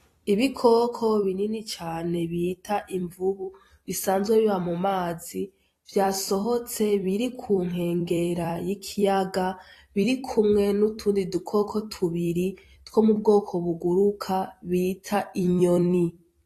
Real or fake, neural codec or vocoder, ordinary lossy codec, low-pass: fake; vocoder, 44.1 kHz, 128 mel bands every 256 samples, BigVGAN v2; AAC, 48 kbps; 14.4 kHz